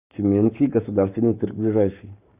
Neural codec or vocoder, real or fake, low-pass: codec, 44.1 kHz, 7.8 kbps, Pupu-Codec; fake; 3.6 kHz